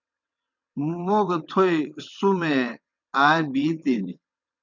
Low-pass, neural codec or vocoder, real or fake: 7.2 kHz; vocoder, 22.05 kHz, 80 mel bands, WaveNeXt; fake